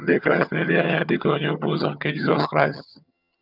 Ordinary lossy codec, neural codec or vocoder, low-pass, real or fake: none; vocoder, 22.05 kHz, 80 mel bands, HiFi-GAN; 5.4 kHz; fake